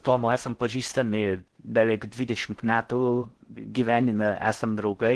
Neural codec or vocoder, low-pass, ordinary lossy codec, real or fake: codec, 16 kHz in and 24 kHz out, 0.6 kbps, FocalCodec, streaming, 4096 codes; 10.8 kHz; Opus, 16 kbps; fake